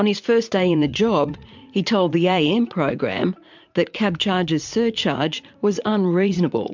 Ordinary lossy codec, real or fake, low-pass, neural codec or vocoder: MP3, 64 kbps; fake; 7.2 kHz; vocoder, 44.1 kHz, 80 mel bands, Vocos